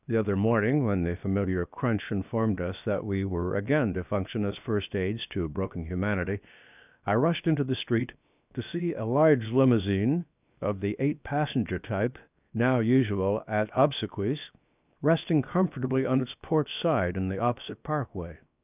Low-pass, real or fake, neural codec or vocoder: 3.6 kHz; fake; codec, 16 kHz, 0.7 kbps, FocalCodec